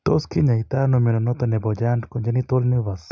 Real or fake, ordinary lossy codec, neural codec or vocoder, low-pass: real; none; none; none